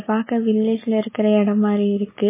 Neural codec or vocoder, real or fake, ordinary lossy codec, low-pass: none; real; MP3, 16 kbps; 3.6 kHz